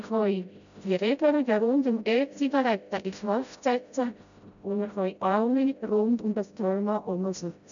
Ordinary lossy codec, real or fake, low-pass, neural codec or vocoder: none; fake; 7.2 kHz; codec, 16 kHz, 0.5 kbps, FreqCodec, smaller model